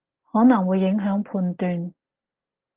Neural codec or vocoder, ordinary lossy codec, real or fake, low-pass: none; Opus, 16 kbps; real; 3.6 kHz